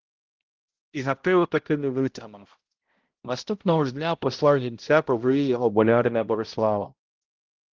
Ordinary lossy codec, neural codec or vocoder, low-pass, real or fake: Opus, 16 kbps; codec, 16 kHz, 0.5 kbps, X-Codec, HuBERT features, trained on balanced general audio; 7.2 kHz; fake